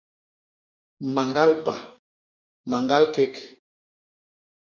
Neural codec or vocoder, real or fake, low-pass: codec, 44.1 kHz, 2.6 kbps, DAC; fake; 7.2 kHz